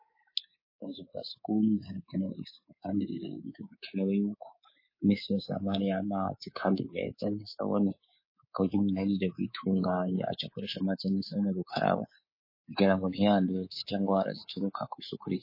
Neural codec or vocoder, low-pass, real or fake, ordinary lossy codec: codec, 24 kHz, 3.1 kbps, DualCodec; 5.4 kHz; fake; MP3, 24 kbps